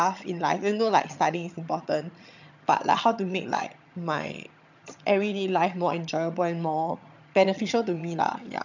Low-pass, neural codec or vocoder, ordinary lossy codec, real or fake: 7.2 kHz; vocoder, 22.05 kHz, 80 mel bands, HiFi-GAN; none; fake